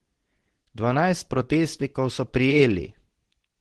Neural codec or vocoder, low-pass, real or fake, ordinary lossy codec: codec, 24 kHz, 0.9 kbps, WavTokenizer, medium speech release version 2; 10.8 kHz; fake; Opus, 16 kbps